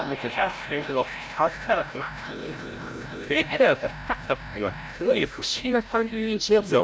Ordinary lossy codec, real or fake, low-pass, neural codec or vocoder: none; fake; none; codec, 16 kHz, 0.5 kbps, FreqCodec, larger model